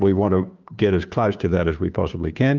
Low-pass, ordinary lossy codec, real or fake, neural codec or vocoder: 7.2 kHz; Opus, 32 kbps; fake; codec, 16 kHz, 2 kbps, FunCodec, trained on Chinese and English, 25 frames a second